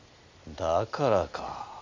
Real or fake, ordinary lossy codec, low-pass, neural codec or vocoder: real; MP3, 64 kbps; 7.2 kHz; none